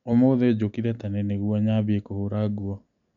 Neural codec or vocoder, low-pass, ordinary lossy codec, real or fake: none; 7.2 kHz; none; real